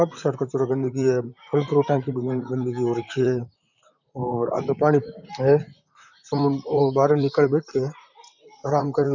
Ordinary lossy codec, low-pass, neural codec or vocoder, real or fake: none; 7.2 kHz; vocoder, 44.1 kHz, 128 mel bands, Pupu-Vocoder; fake